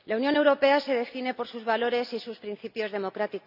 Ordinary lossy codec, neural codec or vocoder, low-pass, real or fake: none; none; 5.4 kHz; real